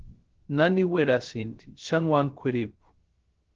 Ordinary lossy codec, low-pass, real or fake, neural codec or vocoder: Opus, 16 kbps; 7.2 kHz; fake; codec, 16 kHz, 0.3 kbps, FocalCodec